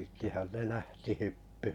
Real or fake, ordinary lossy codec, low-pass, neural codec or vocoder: fake; none; 19.8 kHz; vocoder, 44.1 kHz, 128 mel bands every 512 samples, BigVGAN v2